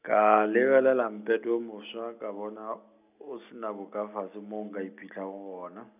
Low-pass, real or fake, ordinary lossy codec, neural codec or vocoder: 3.6 kHz; real; none; none